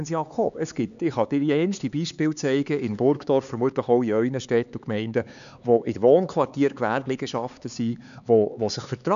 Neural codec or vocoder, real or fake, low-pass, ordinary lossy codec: codec, 16 kHz, 4 kbps, X-Codec, HuBERT features, trained on LibriSpeech; fake; 7.2 kHz; none